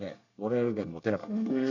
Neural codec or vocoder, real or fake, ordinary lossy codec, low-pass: codec, 24 kHz, 1 kbps, SNAC; fake; none; 7.2 kHz